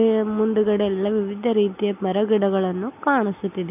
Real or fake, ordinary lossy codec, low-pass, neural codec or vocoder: real; none; 3.6 kHz; none